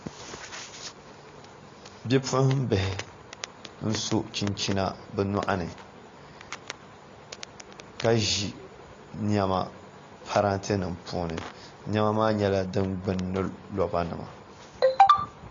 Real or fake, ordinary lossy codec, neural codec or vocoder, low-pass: real; AAC, 32 kbps; none; 7.2 kHz